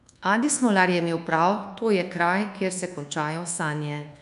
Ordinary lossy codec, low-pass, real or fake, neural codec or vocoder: none; 10.8 kHz; fake; codec, 24 kHz, 1.2 kbps, DualCodec